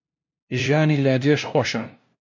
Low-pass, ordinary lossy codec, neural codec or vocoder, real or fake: 7.2 kHz; MP3, 48 kbps; codec, 16 kHz, 0.5 kbps, FunCodec, trained on LibriTTS, 25 frames a second; fake